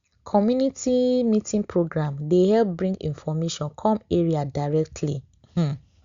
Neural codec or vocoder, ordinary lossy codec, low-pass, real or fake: none; Opus, 64 kbps; 7.2 kHz; real